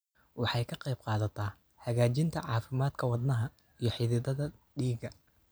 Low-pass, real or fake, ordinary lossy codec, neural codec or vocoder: none; real; none; none